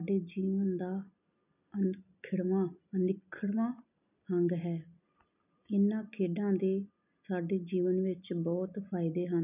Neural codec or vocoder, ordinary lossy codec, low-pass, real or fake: none; none; 3.6 kHz; real